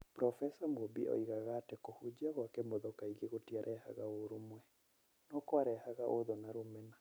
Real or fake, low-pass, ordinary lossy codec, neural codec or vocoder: fake; none; none; vocoder, 44.1 kHz, 128 mel bands every 256 samples, BigVGAN v2